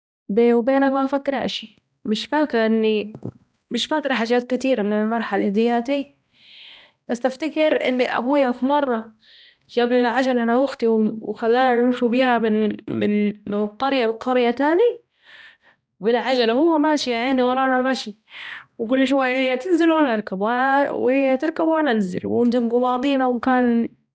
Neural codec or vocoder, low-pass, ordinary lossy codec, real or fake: codec, 16 kHz, 1 kbps, X-Codec, HuBERT features, trained on balanced general audio; none; none; fake